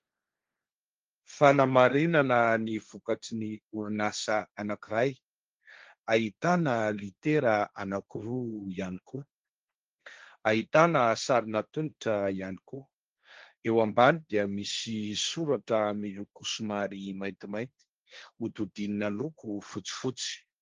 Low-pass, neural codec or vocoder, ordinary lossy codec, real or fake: 7.2 kHz; codec, 16 kHz, 1.1 kbps, Voila-Tokenizer; Opus, 32 kbps; fake